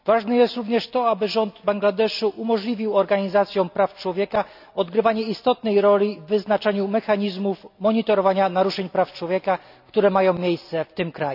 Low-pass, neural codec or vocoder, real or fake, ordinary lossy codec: 5.4 kHz; none; real; none